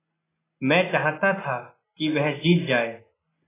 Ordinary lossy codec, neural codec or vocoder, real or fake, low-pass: AAC, 16 kbps; none; real; 3.6 kHz